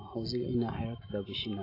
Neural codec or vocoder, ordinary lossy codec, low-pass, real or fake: none; AAC, 24 kbps; 5.4 kHz; real